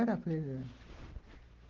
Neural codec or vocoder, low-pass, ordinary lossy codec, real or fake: none; 7.2 kHz; Opus, 16 kbps; real